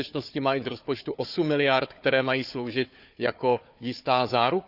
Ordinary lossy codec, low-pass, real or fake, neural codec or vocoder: none; 5.4 kHz; fake; codec, 16 kHz, 4 kbps, FunCodec, trained on Chinese and English, 50 frames a second